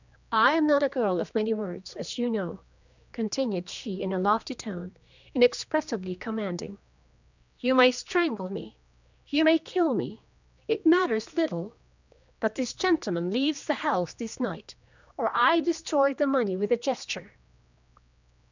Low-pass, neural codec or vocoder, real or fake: 7.2 kHz; codec, 16 kHz, 2 kbps, X-Codec, HuBERT features, trained on general audio; fake